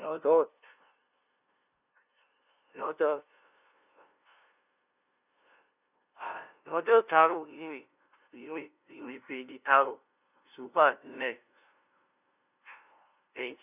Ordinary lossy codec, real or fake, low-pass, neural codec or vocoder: none; fake; 3.6 kHz; codec, 16 kHz, 0.5 kbps, FunCodec, trained on LibriTTS, 25 frames a second